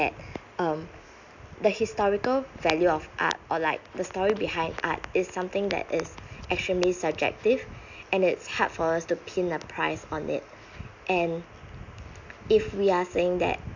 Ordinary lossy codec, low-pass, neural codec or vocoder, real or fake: none; 7.2 kHz; none; real